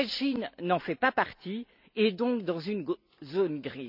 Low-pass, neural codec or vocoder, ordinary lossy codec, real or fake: 5.4 kHz; none; none; real